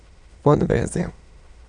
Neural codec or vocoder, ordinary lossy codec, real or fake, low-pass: autoencoder, 22.05 kHz, a latent of 192 numbers a frame, VITS, trained on many speakers; none; fake; 9.9 kHz